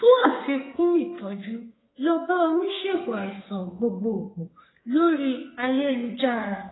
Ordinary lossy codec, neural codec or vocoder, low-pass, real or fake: AAC, 16 kbps; codec, 32 kHz, 1.9 kbps, SNAC; 7.2 kHz; fake